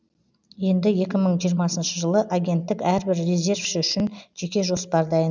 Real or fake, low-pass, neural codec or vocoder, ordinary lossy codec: real; 7.2 kHz; none; none